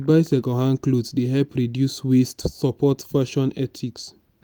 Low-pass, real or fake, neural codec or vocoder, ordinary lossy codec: none; real; none; none